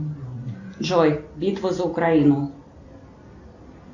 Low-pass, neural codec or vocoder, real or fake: 7.2 kHz; none; real